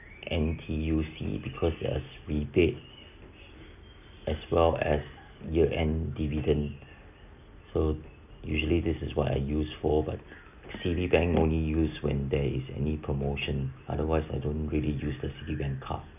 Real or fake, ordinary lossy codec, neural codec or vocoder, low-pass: real; none; none; 3.6 kHz